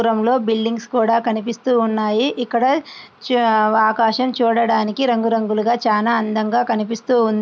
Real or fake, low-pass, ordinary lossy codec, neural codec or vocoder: real; none; none; none